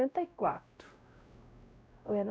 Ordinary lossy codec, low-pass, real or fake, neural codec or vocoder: none; none; fake; codec, 16 kHz, 0.5 kbps, X-Codec, WavLM features, trained on Multilingual LibriSpeech